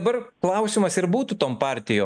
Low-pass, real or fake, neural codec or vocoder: 9.9 kHz; real; none